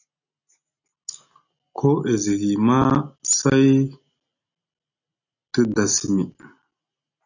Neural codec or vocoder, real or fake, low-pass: none; real; 7.2 kHz